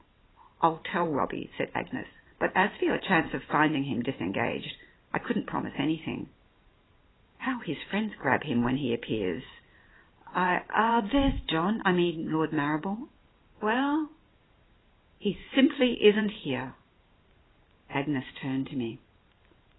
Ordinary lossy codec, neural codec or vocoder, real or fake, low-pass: AAC, 16 kbps; vocoder, 44.1 kHz, 128 mel bands every 512 samples, BigVGAN v2; fake; 7.2 kHz